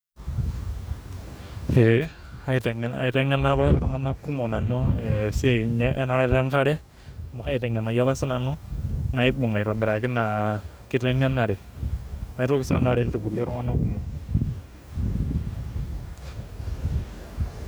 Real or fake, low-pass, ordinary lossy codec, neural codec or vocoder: fake; none; none; codec, 44.1 kHz, 2.6 kbps, DAC